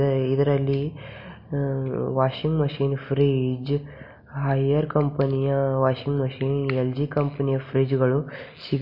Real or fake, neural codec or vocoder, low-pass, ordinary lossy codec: real; none; 5.4 kHz; MP3, 32 kbps